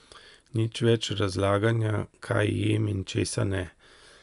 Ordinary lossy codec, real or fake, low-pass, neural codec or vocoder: none; real; 10.8 kHz; none